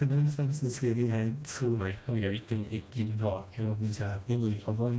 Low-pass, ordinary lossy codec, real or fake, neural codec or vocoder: none; none; fake; codec, 16 kHz, 1 kbps, FreqCodec, smaller model